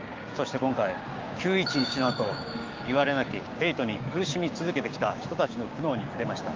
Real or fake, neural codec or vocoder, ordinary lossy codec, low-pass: fake; codec, 16 kHz, 6 kbps, DAC; Opus, 24 kbps; 7.2 kHz